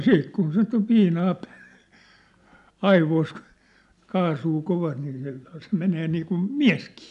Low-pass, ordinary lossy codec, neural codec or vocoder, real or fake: 10.8 kHz; none; none; real